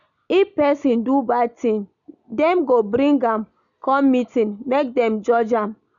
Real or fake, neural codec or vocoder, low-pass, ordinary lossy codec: real; none; 7.2 kHz; none